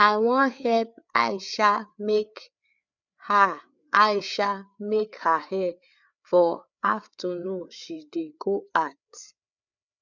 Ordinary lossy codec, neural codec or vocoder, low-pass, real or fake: none; codec, 16 kHz, 4 kbps, FreqCodec, larger model; 7.2 kHz; fake